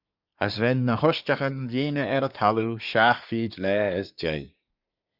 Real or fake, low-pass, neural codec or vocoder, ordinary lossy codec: fake; 5.4 kHz; codec, 24 kHz, 1 kbps, SNAC; Opus, 64 kbps